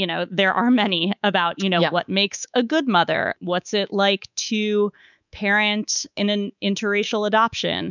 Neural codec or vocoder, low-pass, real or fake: codec, 24 kHz, 3.1 kbps, DualCodec; 7.2 kHz; fake